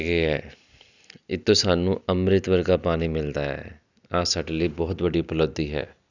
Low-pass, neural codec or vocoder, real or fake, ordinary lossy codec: 7.2 kHz; none; real; none